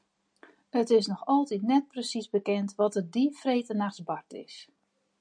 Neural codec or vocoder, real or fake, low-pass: none; real; 9.9 kHz